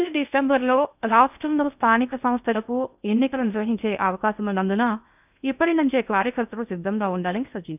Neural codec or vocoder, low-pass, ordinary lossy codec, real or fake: codec, 16 kHz in and 24 kHz out, 0.6 kbps, FocalCodec, streaming, 2048 codes; 3.6 kHz; none; fake